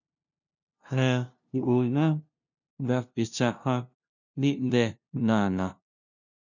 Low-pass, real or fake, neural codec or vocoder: 7.2 kHz; fake; codec, 16 kHz, 0.5 kbps, FunCodec, trained on LibriTTS, 25 frames a second